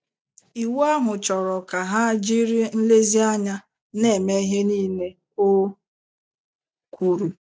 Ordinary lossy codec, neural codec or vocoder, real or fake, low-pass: none; none; real; none